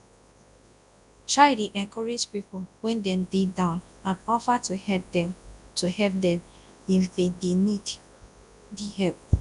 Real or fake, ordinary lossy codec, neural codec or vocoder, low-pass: fake; none; codec, 24 kHz, 0.9 kbps, WavTokenizer, large speech release; 10.8 kHz